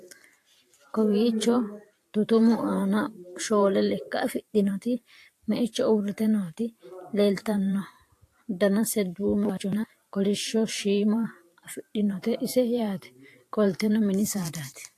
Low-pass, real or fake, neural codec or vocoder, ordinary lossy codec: 14.4 kHz; fake; vocoder, 44.1 kHz, 128 mel bands every 512 samples, BigVGAN v2; AAC, 64 kbps